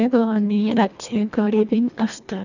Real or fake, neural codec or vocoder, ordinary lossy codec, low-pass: fake; codec, 24 kHz, 1.5 kbps, HILCodec; none; 7.2 kHz